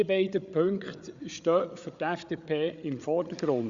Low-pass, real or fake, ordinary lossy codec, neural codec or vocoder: 7.2 kHz; fake; Opus, 64 kbps; codec, 16 kHz, 8 kbps, FreqCodec, larger model